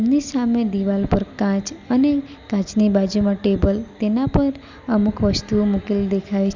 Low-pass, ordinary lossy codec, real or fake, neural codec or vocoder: 7.2 kHz; Opus, 64 kbps; real; none